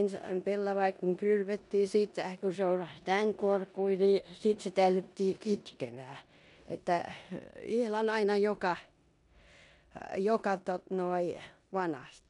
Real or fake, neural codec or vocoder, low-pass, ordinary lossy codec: fake; codec, 16 kHz in and 24 kHz out, 0.9 kbps, LongCat-Audio-Codec, four codebook decoder; 10.8 kHz; MP3, 96 kbps